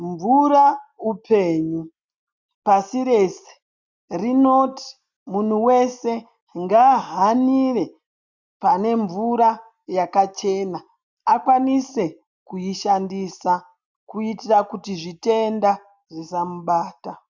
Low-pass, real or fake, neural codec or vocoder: 7.2 kHz; real; none